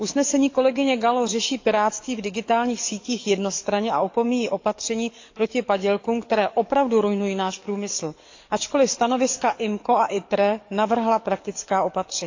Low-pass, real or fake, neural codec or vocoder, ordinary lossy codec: 7.2 kHz; fake; codec, 44.1 kHz, 7.8 kbps, DAC; AAC, 48 kbps